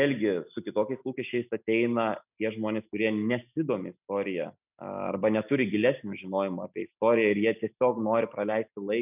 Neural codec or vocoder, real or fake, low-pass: none; real; 3.6 kHz